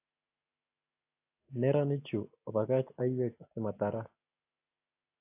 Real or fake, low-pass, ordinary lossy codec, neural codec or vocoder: real; 3.6 kHz; AAC, 24 kbps; none